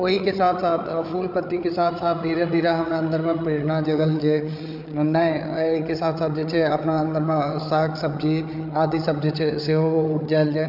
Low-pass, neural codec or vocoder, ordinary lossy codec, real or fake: 5.4 kHz; codec, 16 kHz, 8 kbps, FreqCodec, larger model; none; fake